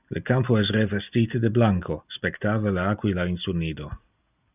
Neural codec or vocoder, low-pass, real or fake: none; 3.6 kHz; real